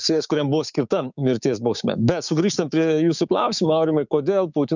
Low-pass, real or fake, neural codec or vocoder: 7.2 kHz; fake; codec, 16 kHz, 6 kbps, DAC